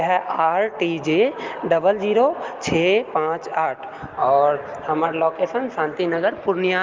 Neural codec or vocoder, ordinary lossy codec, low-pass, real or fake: none; Opus, 32 kbps; 7.2 kHz; real